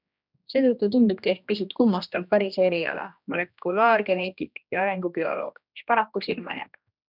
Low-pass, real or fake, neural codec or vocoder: 5.4 kHz; fake; codec, 16 kHz, 1 kbps, X-Codec, HuBERT features, trained on general audio